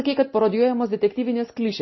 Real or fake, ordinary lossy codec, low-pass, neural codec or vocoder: real; MP3, 24 kbps; 7.2 kHz; none